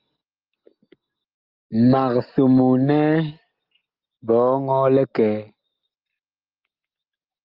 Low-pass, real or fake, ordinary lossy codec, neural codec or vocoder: 5.4 kHz; real; Opus, 24 kbps; none